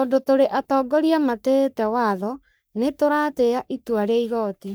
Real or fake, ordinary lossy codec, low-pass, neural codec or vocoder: fake; none; none; codec, 44.1 kHz, 3.4 kbps, Pupu-Codec